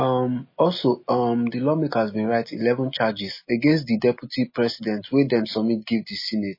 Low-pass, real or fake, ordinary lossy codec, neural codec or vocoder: 5.4 kHz; real; MP3, 24 kbps; none